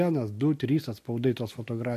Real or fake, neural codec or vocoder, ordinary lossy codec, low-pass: real; none; MP3, 64 kbps; 14.4 kHz